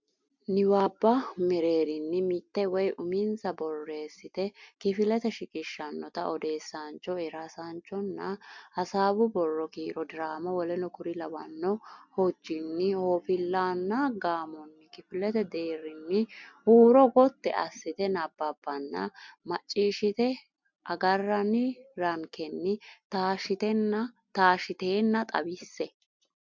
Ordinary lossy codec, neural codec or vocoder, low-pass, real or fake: MP3, 64 kbps; none; 7.2 kHz; real